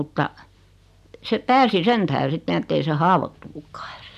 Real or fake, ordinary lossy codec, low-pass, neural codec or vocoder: real; none; 14.4 kHz; none